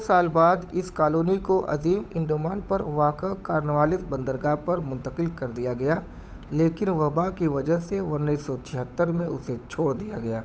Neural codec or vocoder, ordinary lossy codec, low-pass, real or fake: codec, 16 kHz, 8 kbps, FunCodec, trained on Chinese and English, 25 frames a second; none; none; fake